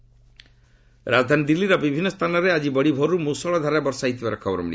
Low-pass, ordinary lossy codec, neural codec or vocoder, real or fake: none; none; none; real